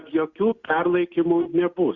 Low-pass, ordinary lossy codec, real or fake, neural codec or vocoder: 7.2 kHz; Opus, 64 kbps; real; none